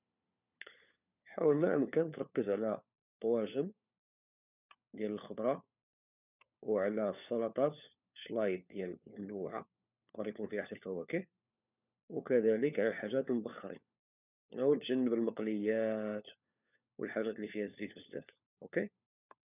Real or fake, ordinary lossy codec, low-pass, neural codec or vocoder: fake; none; 3.6 kHz; codec, 16 kHz, 4 kbps, FunCodec, trained on LibriTTS, 50 frames a second